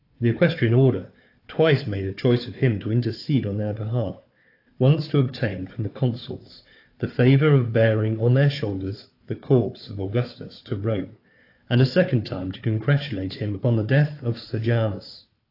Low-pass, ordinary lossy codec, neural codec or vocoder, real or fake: 5.4 kHz; AAC, 32 kbps; codec, 16 kHz, 4 kbps, FunCodec, trained on Chinese and English, 50 frames a second; fake